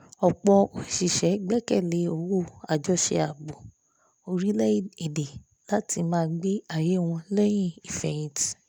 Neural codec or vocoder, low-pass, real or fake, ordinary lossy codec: none; none; real; none